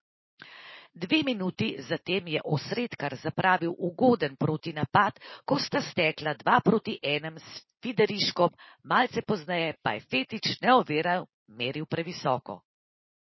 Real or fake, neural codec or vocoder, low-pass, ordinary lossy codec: real; none; 7.2 kHz; MP3, 24 kbps